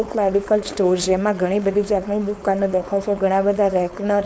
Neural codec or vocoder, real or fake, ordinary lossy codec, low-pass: codec, 16 kHz, 4.8 kbps, FACodec; fake; none; none